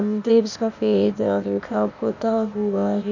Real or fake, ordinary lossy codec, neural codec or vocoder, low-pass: fake; none; codec, 16 kHz, 0.8 kbps, ZipCodec; 7.2 kHz